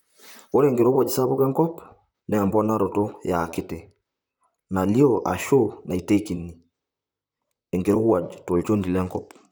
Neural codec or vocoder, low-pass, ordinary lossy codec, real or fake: vocoder, 44.1 kHz, 128 mel bands, Pupu-Vocoder; none; none; fake